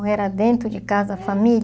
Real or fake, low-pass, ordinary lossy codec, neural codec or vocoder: real; none; none; none